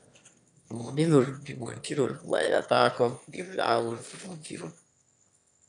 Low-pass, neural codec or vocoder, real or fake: 9.9 kHz; autoencoder, 22.05 kHz, a latent of 192 numbers a frame, VITS, trained on one speaker; fake